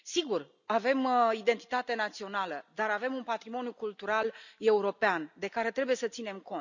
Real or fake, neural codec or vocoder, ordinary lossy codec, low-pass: real; none; none; 7.2 kHz